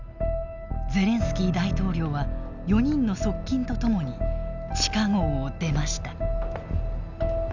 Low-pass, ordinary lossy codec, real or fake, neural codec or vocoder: 7.2 kHz; none; real; none